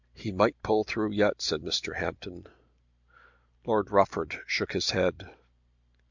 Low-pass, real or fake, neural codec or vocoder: 7.2 kHz; real; none